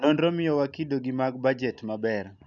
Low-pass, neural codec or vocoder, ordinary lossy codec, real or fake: 7.2 kHz; none; none; real